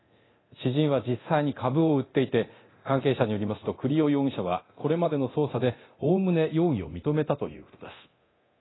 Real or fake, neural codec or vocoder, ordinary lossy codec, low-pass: fake; codec, 24 kHz, 0.9 kbps, DualCodec; AAC, 16 kbps; 7.2 kHz